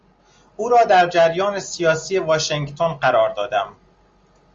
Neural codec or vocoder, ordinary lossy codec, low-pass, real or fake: none; Opus, 32 kbps; 7.2 kHz; real